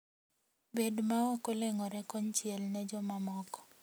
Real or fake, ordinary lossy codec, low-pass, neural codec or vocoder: real; none; none; none